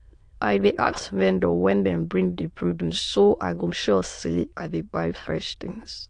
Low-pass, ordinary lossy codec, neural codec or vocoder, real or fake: 9.9 kHz; AAC, 48 kbps; autoencoder, 22.05 kHz, a latent of 192 numbers a frame, VITS, trained on many speakers; fake